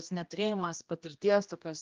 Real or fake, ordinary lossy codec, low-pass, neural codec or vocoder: fake; Opus, 32 kbps; 7.2 kHz; codec, 16 kHz, 1 kbps, X-Codec, HuBERT features, trained on general audio